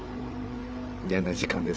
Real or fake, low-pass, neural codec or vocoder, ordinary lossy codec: fake; none; codec, 16 kHz, 8 kbps, FreqCodec, larger model; none